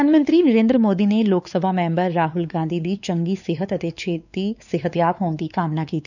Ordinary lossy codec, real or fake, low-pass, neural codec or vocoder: none; fake; 7.2 kHz; codec, 16 kHz, 4 kbps, X-Codec, WavLM features, trained on Multilingual LibriSpeech